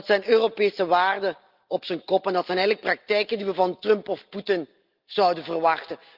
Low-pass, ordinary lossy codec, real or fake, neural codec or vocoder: 5.4 kHz; Opus, 24 kbps; real; none